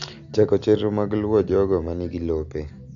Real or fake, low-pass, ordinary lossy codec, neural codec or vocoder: real; 7.2 kHz; AAC, 64 kbps; none